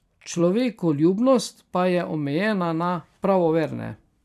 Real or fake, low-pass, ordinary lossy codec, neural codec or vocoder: fake; 14.4 kHz; none; vocoder, 44.1 kHz, 128 mel bands every 256 samples, BigVGAN v2